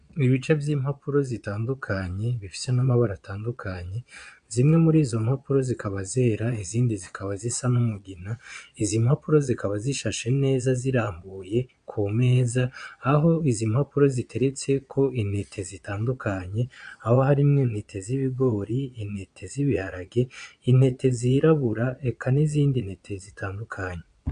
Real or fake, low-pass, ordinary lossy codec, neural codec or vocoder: fake; 9.9 kHz; AAC, 96 kbps; vocoder, 22.05 kHz, 80 mel bands, Vocos